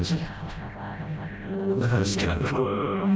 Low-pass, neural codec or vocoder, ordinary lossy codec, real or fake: none; codec, 16 kHz, 0.5 kbps, FreqCodec, smaller model; none; fake